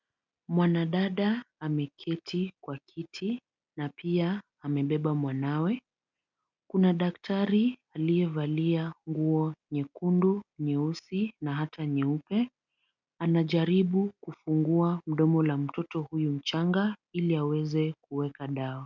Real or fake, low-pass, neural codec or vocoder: real; 7.2 kHz; none